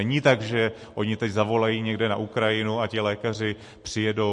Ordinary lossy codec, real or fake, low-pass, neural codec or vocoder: MP3, 48 kbps; real; 10.8 kHz; none